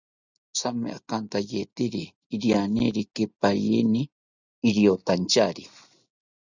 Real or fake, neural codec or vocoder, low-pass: real; none; 7.2 kHz